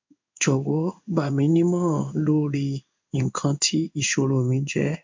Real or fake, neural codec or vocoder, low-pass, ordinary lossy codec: fake; codec, 16 kHz in and 24 kHz out, 1 kbps, XY-Tokenizer; 7.2 kHz; MP3, 64 kbps